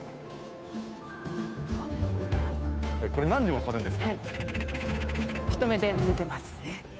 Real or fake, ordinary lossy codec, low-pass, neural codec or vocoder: fake; none; none; codec, 16 kHz, 2 kbps, FunCodec, trained on Chinese and English, 25 frames a second